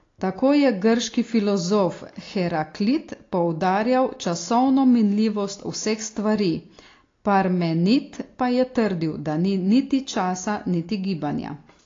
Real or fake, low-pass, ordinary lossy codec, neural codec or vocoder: real; 7.2 kHz; AAC, 32 kbps; none